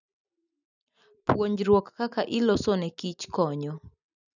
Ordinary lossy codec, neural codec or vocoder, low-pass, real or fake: none; none; 7.2 kHz; real